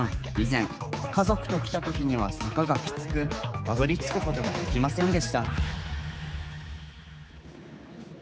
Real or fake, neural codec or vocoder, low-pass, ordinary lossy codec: fake; codec, 16 kHz, 4 kbps, X-Codec, HuBERT features, trained on general audio; none; none